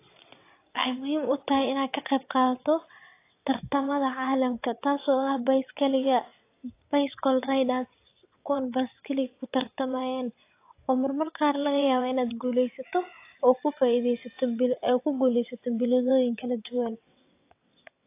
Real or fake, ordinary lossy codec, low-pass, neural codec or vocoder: fake; AAC, 24 kbps; 3.6 kHz; vocoder, 22.05 kHz, 80 mel bands, WaveNeXt